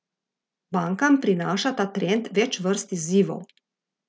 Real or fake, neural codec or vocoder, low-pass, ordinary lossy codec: real; none; none; none